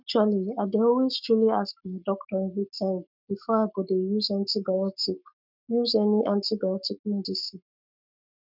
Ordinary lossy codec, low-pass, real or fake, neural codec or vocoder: none; 5.4 kHz; fake; codec, 44.1 kHz, 7.8 kbps, Pupu-Codec